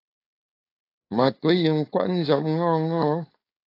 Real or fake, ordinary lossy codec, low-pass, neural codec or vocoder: fake; AAC, 32 kbps; 5.4 kHz; codec, 16 kHz, 4.8 kbps, FACodec